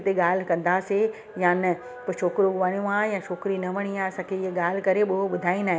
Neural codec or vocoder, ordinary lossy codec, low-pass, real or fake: none; none; none; real